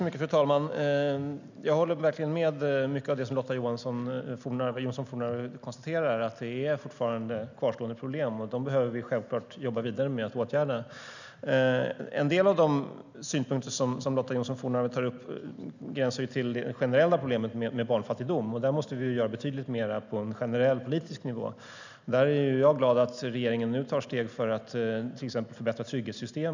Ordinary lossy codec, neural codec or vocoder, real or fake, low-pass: none; none; real; 7.2 kHz